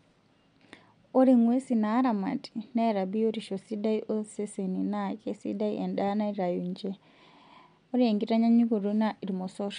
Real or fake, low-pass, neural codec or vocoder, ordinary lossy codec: real; 9.9 kHz; none; MP3, 64 kbps